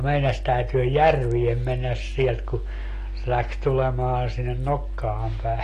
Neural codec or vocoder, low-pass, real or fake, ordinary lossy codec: none; 14.4 kHz; real; AAC, 48 kbps